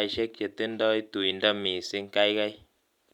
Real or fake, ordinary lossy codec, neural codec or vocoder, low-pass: real; none; none; 19.8 kHz